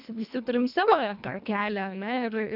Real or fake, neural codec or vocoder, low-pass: fake; codec, 24 kHz, 1.5 kbps, HILCodec; 5.4 kHz